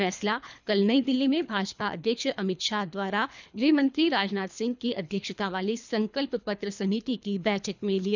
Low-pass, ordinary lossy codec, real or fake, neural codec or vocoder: 7.2 kHz; none; fake; codec, 24 kHz, 3 kbps, HILCodec